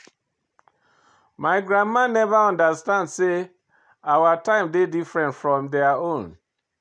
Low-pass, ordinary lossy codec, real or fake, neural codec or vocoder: 9.9 kHz; none; real; none